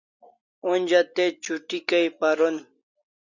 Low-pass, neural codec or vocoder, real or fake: 7.2 kHz; none; real